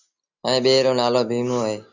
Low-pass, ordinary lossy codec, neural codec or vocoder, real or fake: 7.2 kHz; AAC, 48 kbps; none; real